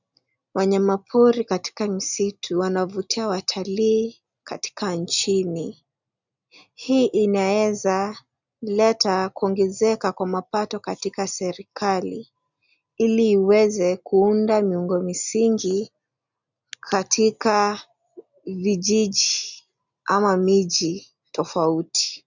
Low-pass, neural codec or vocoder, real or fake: 7.2 kHz; none; real